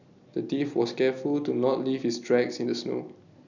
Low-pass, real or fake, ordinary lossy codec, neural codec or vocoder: 7.2 kHz; real; none; none